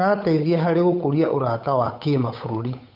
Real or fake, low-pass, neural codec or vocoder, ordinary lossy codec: fake; 5.4 kHz; codec, 16 kHz, 8 kbps, FunCodec, trained on Chinese and English, 25 frames a second; none